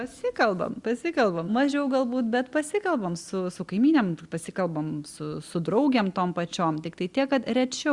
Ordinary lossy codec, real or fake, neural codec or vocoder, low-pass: Opus, 64 kbps; real; none; 10.8 kHz